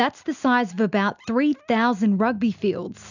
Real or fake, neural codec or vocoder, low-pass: real; none; 7.2 kHz